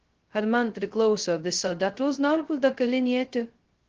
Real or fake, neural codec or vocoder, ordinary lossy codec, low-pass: fake; codec, 16 kHz, 0.2 kbps, FocalCodec; Opus, 16 kbps; 7.2 kHz